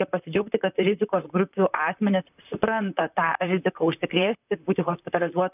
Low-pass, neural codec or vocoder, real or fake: 3.6 kHz; vocoder, 44.1 kHz, 128 mel bands, Pupu-Vocoder; fake